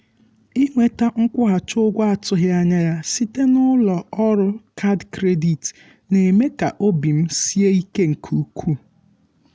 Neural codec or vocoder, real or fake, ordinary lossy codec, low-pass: none; real; none; none